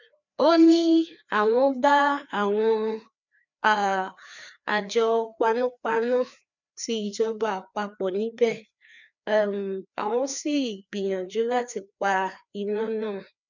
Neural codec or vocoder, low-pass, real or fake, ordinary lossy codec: codec, 16 kHz, 2 kbps, FreqCodec, larger model; 7.2 kHz; fake; none